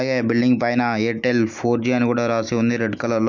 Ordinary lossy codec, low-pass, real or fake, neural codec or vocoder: none; 7.2 kHz; real; none